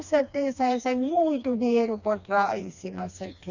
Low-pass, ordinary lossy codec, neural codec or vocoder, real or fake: 7.2 kHz; none; codec, 16 kHz, 2 kbps, FreqCodec, smaller model; fake